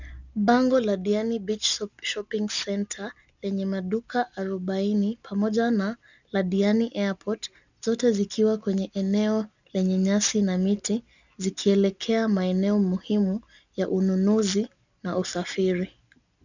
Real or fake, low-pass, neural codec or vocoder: real; 7.2 kHz; none